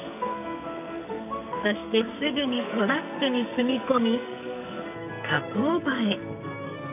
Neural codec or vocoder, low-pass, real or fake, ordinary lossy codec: codec, 44.1 kHz, 2.6 kbps, SNAC; 3.6 kHz; fake; none